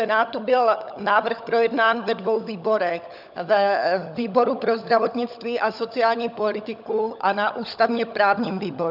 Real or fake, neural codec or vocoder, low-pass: fake; codec, 16 kHz, 16 kbps, FunCodec, trained on LibriTTS, 50 frames a second; 5.4 kHz